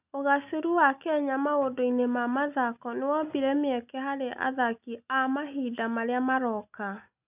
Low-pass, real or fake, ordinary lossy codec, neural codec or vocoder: 3.6 kHz; real; none; none